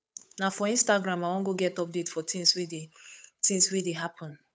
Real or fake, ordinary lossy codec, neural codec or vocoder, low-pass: fake; none; codec, 16 kHz, 8 kbps, FunCodec, trained on Chinese and English, 25 frames a second; none